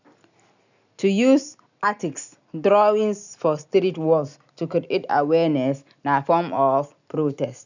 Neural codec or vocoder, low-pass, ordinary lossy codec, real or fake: none; 7.2 kHz; none; real